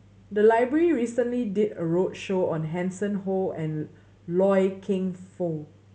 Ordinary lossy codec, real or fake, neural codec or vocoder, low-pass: none; real; none; none